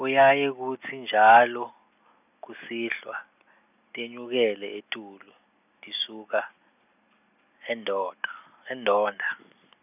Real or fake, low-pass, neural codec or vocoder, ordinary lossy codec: real; 3.6 kHz; none; none